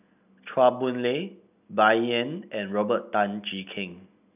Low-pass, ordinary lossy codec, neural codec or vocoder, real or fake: 3.6 kHz; none; none; real